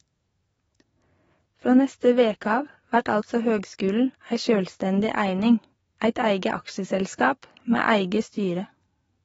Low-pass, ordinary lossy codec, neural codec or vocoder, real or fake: 19.8 kHz; AAC, 24 kbps; codec, 44.1 kHz, 7.8 kbps, DAC; fake